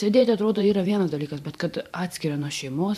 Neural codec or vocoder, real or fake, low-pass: vocoder, 44.1 kHz, 128 mel bands every 512 samples, BigVGAN v2; fake; 14.4 kHz